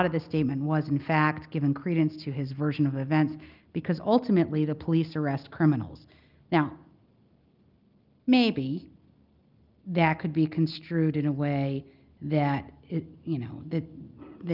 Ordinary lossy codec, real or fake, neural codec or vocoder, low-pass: Opus, 32 kbps; real; none; 5.4 kHz